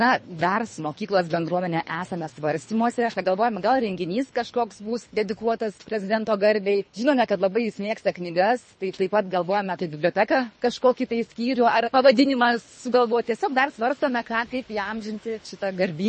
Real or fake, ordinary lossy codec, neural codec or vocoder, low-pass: fake; MP3, 32 kbps; codec, 24 kHz, 3 kbps, HILCodec; 10.8 kHz